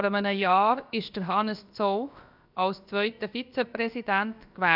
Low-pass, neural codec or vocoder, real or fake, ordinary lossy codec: 5.4 kHz; codec, 16 kHz, about 1 kbps, DyCAST, with the encoder's durations; fake; none